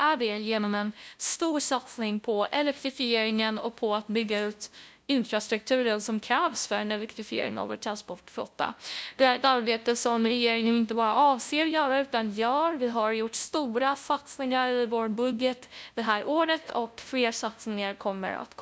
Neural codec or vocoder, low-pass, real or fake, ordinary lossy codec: codec, 16 kHz, 0.5 kbps, FunCodec, trained on LibriTTS, 25 frames a second; none; fake; none